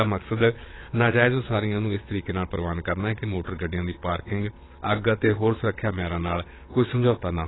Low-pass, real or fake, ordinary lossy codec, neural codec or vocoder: 7.2 kHz; fake; AAC, 16 kbps; vocoder, 22.05 kHz, 80 mel bands, Vocos